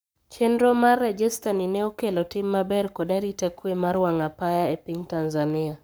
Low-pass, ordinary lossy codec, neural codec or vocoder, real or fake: none; none; codec, 44.1 kHz, 7.8 kbps, Pupu-Codec; fake